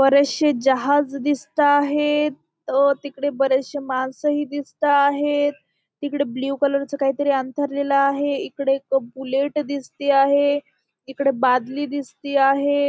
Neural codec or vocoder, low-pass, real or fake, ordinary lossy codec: none; none; real; none